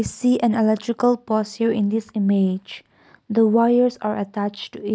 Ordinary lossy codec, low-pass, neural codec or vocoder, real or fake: none; none; none; real